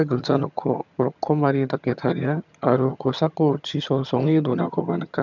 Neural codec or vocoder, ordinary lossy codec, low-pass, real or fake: vocoder, 22.05 kHz, 80 mel bands, HiFi-GAN; none; 7.2 kHz; fake